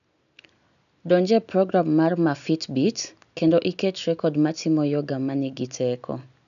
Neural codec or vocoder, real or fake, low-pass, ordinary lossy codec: none; real; 7.2 kHz; none